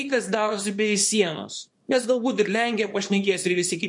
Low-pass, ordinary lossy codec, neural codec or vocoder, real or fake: 10.8 kHz; MP3, 48 kbps; codec, 24 kHz, 0.9 kbps, WavTokenizer, small release; fake